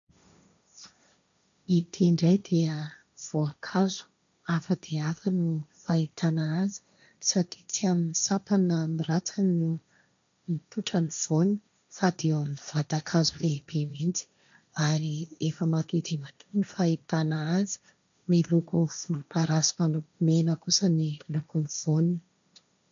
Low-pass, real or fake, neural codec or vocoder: 7.2 kHz; fake; codec, 16 kHz, 1.1 kbps, Voila-Tokenizer